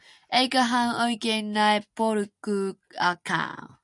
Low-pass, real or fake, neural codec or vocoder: 10.8 kHz; real; none